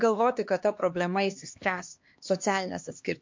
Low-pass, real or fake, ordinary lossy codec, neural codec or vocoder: 7.2 kHz; fake; MP3, 48 kbps; codec, 16 kHz, 2 kbps, X-Codec, HuBERT features, trained on LibriSpeech